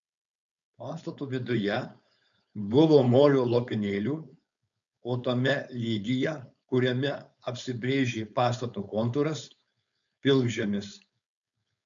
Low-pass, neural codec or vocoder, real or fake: 7.2 kHz; codec, 16 kHz, 4.8 kbps, FACodec; fake